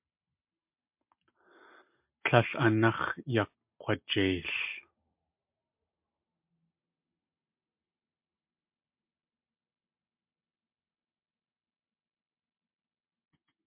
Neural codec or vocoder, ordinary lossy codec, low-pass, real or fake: none; MP3, 32 kbps; 3.6 kHz; real